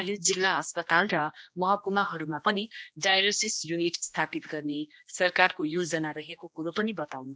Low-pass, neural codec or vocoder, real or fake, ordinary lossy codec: none; codec, 16 kHz, 1 kbps, X-Codec, HuBERT features, trained on general audio; fake; none